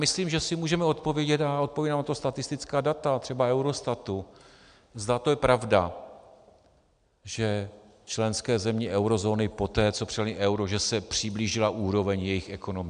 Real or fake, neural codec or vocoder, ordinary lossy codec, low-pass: real; none; MP3, 96 kbps; 9.9 kHz